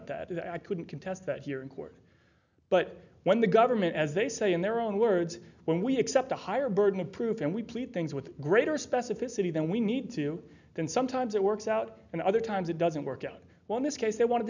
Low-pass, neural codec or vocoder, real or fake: 7.2 kHz; none; real